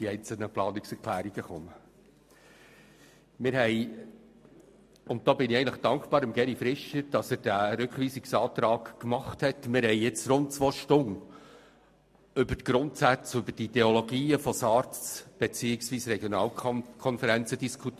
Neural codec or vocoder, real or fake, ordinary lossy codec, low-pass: none; real; MP3, 64 kbps; 14.4 kHz